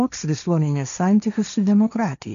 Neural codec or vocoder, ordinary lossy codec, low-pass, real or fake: codec, 16 kHz, 1.1 kbps, Voila-Tokenizer; AAC, 96 kbps; 7.2 kHz; fake